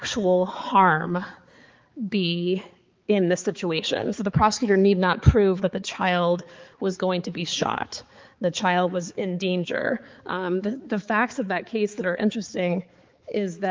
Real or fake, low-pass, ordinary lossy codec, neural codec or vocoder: fake; 7.2 kHz; Opus, 32 kbps; codec, 16 kHz, 4 kbps, X-Codec, HuBERT features, trained on balanced general audio